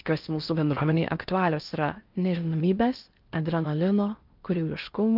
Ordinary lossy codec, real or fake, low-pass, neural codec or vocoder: Opus, 24 kbps; fake; 5.4 kHz; codec, 16 kHz in and 24 kHz out, 0.6 kbps, FocalCodec, streaming, 4096 codes